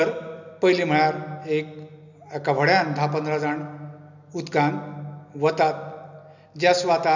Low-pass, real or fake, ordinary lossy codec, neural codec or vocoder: 7.2 kHz; real; none; none